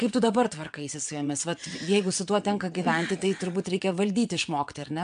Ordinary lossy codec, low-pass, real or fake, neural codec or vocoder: MP3, 64 kbps; 9.9 kHz; fake; vocoder, 22.05 kHz, 80 mel bands, WaveNeXt